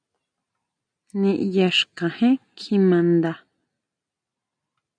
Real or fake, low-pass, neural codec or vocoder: real; 9.9 kHz; none